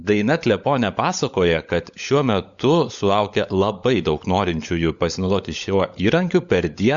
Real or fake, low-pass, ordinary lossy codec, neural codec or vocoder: fake; 7.2 kHz; Opus, 64 kbps; codec, 16 kHz, 16 kbps, FunCodec, trained on LibriTTS, 50 frames a second